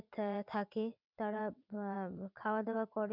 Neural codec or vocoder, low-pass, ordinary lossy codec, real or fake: vocoder, 22.05 kHz, 80 mel bands, WaveNeXt; 5.4 kHz; none; fake